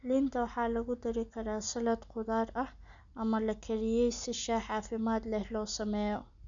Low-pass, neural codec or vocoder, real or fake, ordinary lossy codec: 7.2 kHz; none; real; none